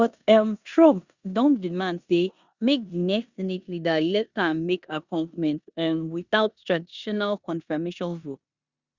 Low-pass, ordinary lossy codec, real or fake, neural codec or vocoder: 7.2 kHz; Opus, 64 kbps; fake; codec, 16 kHz in and 24 kHz out, 0.9 kbps, LongCat-Audio-Codec, four codebook decoder